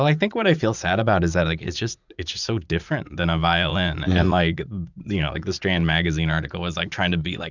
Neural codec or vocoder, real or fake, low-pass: vocoder, 44.1 kHz, 80 mel bands, Vocos; fake; 7.2 kHz